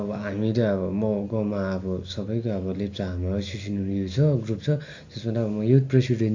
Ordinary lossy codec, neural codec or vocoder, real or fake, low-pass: AAC, 48 kbps; none; real; 7.2 kHz